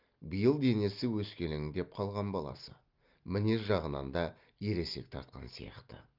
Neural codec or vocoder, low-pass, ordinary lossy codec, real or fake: none; 5.4 kHz; Opus, 24 kbps; real